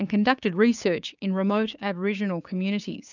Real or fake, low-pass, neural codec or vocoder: fake; 7.2 kHz; codec, 16 kHz, 6 kbps, DAC